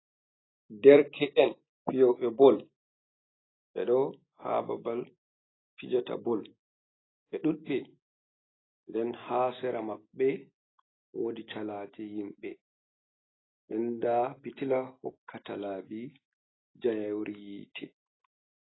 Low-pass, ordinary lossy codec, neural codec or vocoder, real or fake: 7.2 kHz; AAC, 16 kbps; none; real